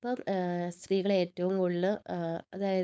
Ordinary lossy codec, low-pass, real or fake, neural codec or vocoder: none; none; fake; codec, 16 kHz, 4.8 kbps, FACodec